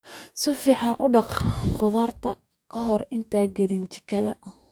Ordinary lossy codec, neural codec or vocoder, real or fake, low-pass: none; codec, 44.1 kHz, 2.6 kbps, DAC; fake; none